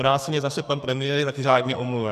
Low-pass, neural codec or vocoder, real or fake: 14.4 kHz; codec, 44.1 kHz, 2.6 kbps, SNAC; fake